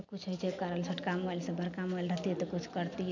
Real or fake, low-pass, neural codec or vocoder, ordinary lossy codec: real; 7.2 kHz; none; none